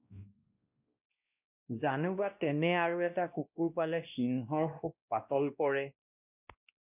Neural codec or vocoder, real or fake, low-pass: codec, 16 kHz, 1 kbps, X-Codec, WavLM features, trained on Multilingual LibriSpeech; fake; 3.6 kHz